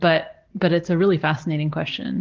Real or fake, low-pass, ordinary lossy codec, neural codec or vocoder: real; 7.2 kHz; Opus, 16 kbps; none